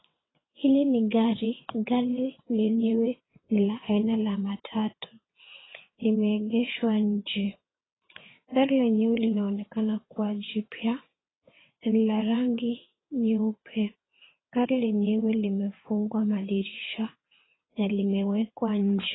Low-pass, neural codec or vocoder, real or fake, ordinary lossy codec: 7.2 kHz; vocoder, 22.05 kHz, 80 mel bands, WaveNeXt; fake; AAC, 16 kbps